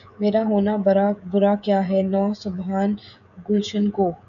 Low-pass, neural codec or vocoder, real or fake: 7.2 kHz; codec, 16 kHz, 16 kbps, FreqCodec, smaller model; fake